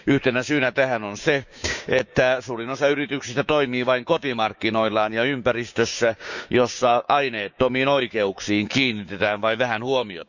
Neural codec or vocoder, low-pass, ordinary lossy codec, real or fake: codec, 16 kHz, 6 kbps, DAC; 7.2 kHz; none; fake